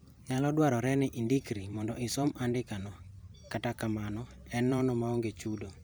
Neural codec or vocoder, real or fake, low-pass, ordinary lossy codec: vocoder, 44.1 kHz, 128 mel bands every 512 samples, BigVGAN v2; fake; none; none